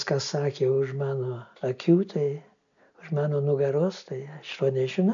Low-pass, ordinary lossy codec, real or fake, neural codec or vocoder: 7.2 kHz; AAC, 64 kbps; real; none